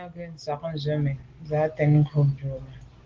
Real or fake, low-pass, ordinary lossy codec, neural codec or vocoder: real; 7.2 kHz; Opus, 16 kbps; none